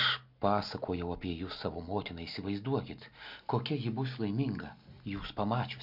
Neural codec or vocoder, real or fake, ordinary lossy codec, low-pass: none; real; MP3, 48 kbps; 5.4 kHz